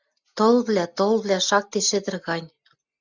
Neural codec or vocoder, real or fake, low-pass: none; real; 7.2 kHz